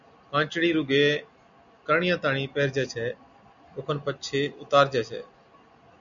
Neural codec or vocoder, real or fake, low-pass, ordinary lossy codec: none; real; 7.2 kHz; MP3, 96 kbps